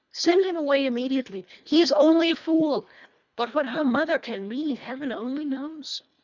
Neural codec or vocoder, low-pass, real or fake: codec, 24 kHz, 1.5 kbps, HILCodec; 7.2 kHz; fake